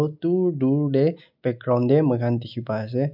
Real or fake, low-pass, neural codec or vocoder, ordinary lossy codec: real; 5.4 kHz; none; none